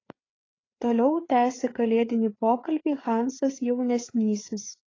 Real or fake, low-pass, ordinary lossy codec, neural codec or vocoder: fake; 7.2 kHz; AAC, 32 kbps; codec, 16 kHz, 4 kbps, FreqCodec, larger model